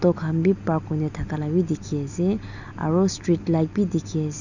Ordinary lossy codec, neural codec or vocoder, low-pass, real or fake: none; none; 7.2 kHz; real